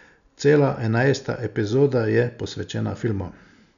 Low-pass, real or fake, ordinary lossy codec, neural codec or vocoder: 7.2 kHz; real; none; none